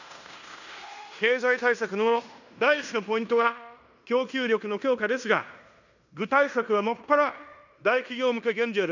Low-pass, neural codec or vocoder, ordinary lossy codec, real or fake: 7.2 kHz; codec, 16 kHz in and 24 kHz out, 0.9 kbps, LongCat-Audio-Codec, fine tuned four codebook decoder; none; fake